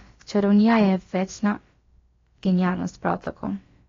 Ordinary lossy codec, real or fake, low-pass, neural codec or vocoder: AAC, 32 kbps; fake; 7.2 kHz; codec, 16 kHz, about 1 kbps, DyCAST, with the encoder's durations